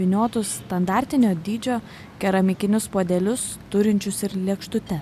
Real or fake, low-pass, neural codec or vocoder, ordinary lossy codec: real; 14.4 kHz; none; AAC, 96 kbps